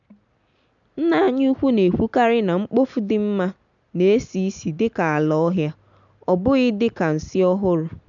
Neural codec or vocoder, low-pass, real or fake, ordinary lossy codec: none; 7.2 kHz; real; none